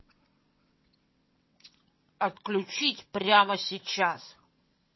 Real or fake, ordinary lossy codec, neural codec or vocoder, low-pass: fake; MP3, 24 kbps; vocoder, 22.05 kHz, 80 mel bands, WaveNeXt; 7.2 kHz